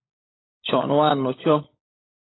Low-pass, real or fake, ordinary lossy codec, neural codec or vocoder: 7.2 kHz; fake; AAC, 16 kbps; codec, 16 kHz, 16 kbps, FunCodec, trained on LibriTTS, 50 frames a second